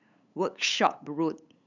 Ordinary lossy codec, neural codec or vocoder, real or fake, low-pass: none; codec, 16 kHz, 8 kbps, FunCodec, trained on LibriTTS, 25 frames a second; fake; 7.2 kHz